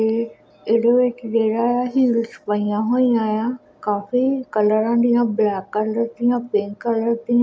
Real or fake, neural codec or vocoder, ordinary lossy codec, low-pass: real; none; none; none